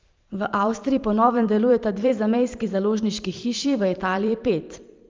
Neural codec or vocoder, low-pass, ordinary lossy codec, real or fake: vocoder, 44.1 kHz, 128 mel bands every 512 samples, BigVGAN v2; 7.2 kHz; Opus, 32 kbps; fake